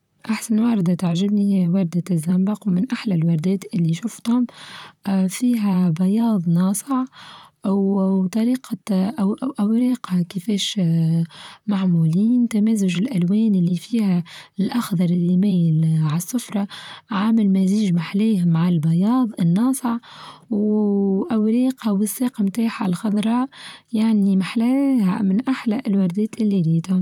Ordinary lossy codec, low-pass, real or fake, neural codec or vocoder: none; 19.8 kHz; fake; vocoder, 44.1 kHz, 128 mel bands, Pupu-Vocoder